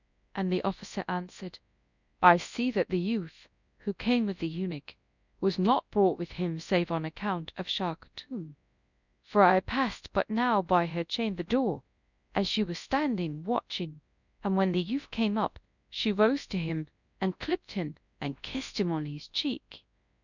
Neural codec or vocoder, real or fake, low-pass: codec, 24 kHz, 0.9 kbps, WavTokenizer, large speech release; fake; 7.2 kHz